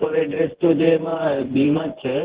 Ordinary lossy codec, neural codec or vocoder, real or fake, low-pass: Opus, 16 kbps; vocoder, 24 kHz, 100 mel bands, Vocos; fake; 3.6 kHz